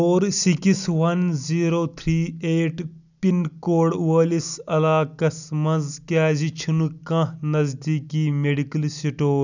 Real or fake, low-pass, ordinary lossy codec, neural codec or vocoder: real; 7.2 kHz; none; none